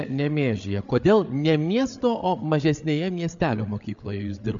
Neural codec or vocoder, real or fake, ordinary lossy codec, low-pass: codec, 16 kHz, 8 kbps, FreqCodec, larger model; fake; MP3, 64 kbps; 7.2 kHz